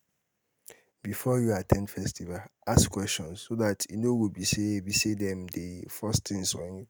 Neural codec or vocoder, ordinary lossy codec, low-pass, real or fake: none; none; none; real